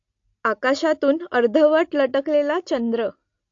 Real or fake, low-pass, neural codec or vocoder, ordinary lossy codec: real; 7.2 kHz; none; AAC, 48 kbps